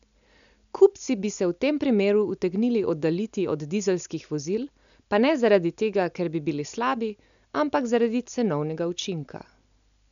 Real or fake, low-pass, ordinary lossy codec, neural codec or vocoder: real; 7.2 kHz; none; none